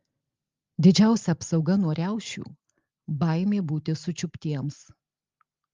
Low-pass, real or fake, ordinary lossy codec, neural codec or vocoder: 7.2 kHz; real; Opus, 32 kbps; none